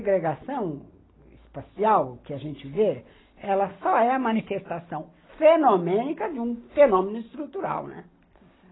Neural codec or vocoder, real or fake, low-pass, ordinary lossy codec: none; real; 7.2 kHz; AAC, 16 kbps